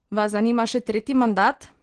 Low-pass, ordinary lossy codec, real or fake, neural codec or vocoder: 9.9 kHz; Opus, 16 kbps; real; none